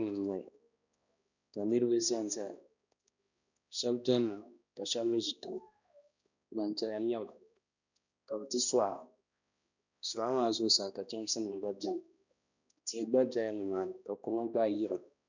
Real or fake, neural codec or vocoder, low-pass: fake; codec, 16 kHz, 1 kbps, X-Codec, HuBERT features, trained on balanced general audio; 7.2 kHz